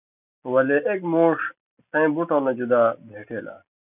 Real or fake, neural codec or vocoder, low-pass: real; none; 3.6 kHz